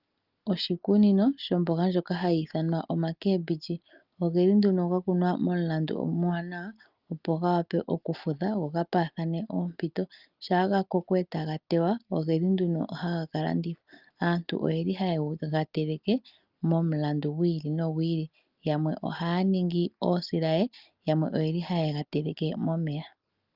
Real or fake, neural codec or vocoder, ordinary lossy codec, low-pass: real; none; Opus, 24 kbps; 5.4 kHz